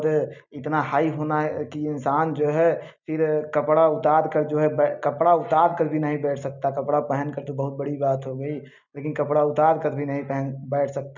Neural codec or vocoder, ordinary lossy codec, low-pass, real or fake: none; none; 7.2 kHz; real